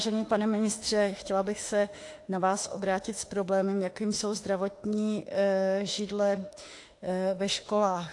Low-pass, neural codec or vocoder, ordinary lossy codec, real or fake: 10.8 kHz; autoencoder, 48 kHz, 32 numbers a frame, DAC-VAE, trained on Japanese speech; AAC, 48 kbps; fake